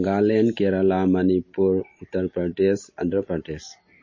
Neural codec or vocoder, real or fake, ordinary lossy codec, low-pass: none; real; MP3, 32 kbps; 7.2 kHz